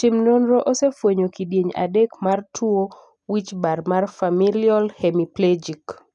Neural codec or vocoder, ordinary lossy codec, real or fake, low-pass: none; none; real; 10.8 kHz